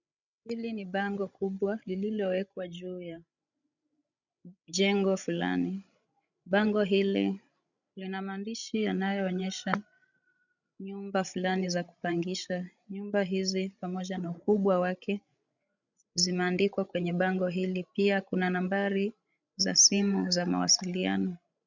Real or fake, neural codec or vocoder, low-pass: fake; codec, 16 kHz, 16 kbps, FreqCodec, larger model; 7.2 kHz